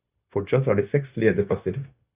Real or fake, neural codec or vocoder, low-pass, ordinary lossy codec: fake; codec, 16 kHz, 0.9 kbps, LongCat-Audio-Codec; 3.6 kHz; Opus, 64 kbps